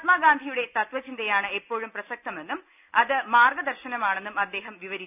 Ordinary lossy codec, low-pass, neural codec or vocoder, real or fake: none; 3.6 kHz; none; real